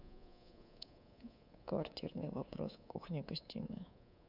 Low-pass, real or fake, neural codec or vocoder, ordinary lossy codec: 5.4 kHz; fake; codec, 24 kHz, 3.1 kbps, DualCodec; none